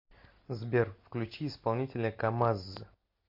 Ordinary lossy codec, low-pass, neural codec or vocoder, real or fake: MP3, 32 kbps; 5.4 kHz; none; real